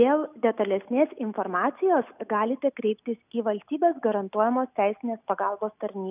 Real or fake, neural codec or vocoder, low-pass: real; none; 3.6 kHz